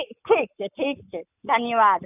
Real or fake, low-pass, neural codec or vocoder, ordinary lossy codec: fake; 3.6 kHz; codec, 44.1 kHz, 7.8 kbps, Pupu-Codec; none